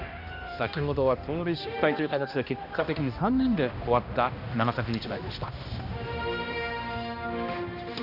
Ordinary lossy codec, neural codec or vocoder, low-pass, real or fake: none; codec, 16 kHz, 1 kbps, X-Codec, HuBERT features, trained on balanced general audio; 5.4 kHz; fake